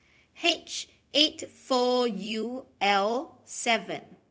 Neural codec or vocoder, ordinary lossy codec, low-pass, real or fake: codec, 16 kHz, 0.4 kbps, LongCat-Audio-Codec; none; none; fake